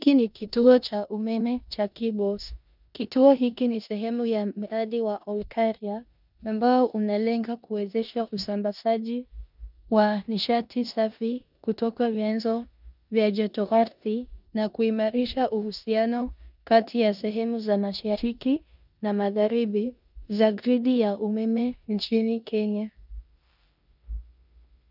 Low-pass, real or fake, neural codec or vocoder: 5.4 kHz; fake; codec, 16 kHz in and 24 kHz out, 0.9 kbps, LongCat-Audio-Codec, four codebook decoder